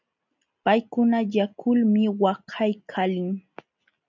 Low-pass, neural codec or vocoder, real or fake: 7.2 kHz; none; real